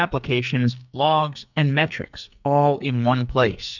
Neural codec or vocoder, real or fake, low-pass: codec, 44.1 kHz, 2.6 kbps, SNAC; fake; 7.2 kHz